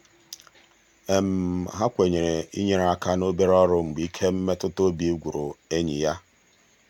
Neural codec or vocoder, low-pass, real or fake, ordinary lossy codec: none; 19.8 kHz; real; none